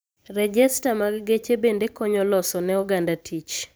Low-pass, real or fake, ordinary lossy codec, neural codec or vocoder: none; fake; none; vocoder, 44.1 kHz, 128 mel bands every 512 samples, BigVGAN v2